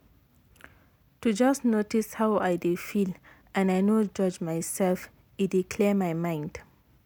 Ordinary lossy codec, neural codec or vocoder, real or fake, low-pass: none; none; real; none